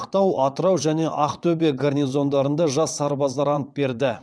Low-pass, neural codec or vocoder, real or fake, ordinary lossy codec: none; vocoder, 22.05 kHz, 80 mel bands, WaveNeXt; fake; none